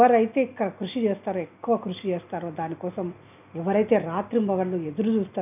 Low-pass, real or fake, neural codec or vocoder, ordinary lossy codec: 3.6 kHz; real; none; none